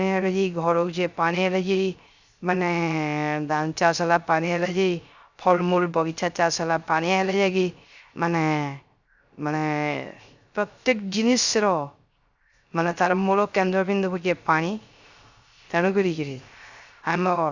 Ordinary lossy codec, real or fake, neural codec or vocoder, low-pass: Opus, 64 kbps; fake; codec, 16 kHz, 0.3 kbps, FocalCodec; 7.2 kHz